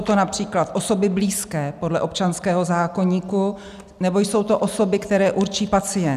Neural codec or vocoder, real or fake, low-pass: none; real; 14.4 kHz